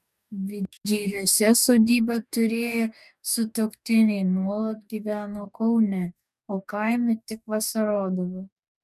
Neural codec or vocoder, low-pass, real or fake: codec, 44.1 kHz, 2.6 kbps, DAC; 14.4 kHz; fake